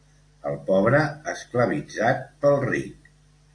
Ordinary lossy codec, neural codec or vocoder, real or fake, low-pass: AAC, 48 kbps; none; real; 9.9 kHz